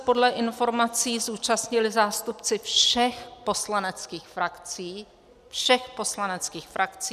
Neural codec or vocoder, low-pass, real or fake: none; 14.4 kHz; real